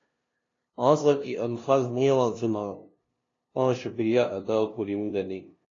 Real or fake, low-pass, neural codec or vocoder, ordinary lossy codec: fake; 7.2 kHz; codec, 16 kHz, 0.5 kbps, FunCodec, trained on LibriTTS, 25 frames a second; AAC, 32 kbps